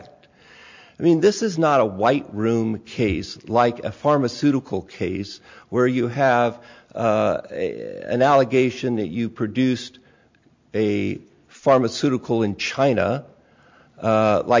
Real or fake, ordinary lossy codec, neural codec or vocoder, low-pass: real; MP3, 64 kbps; none; 7.2 kHz